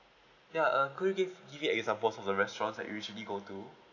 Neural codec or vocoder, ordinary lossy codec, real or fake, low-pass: none; none; real; 7.2 kHz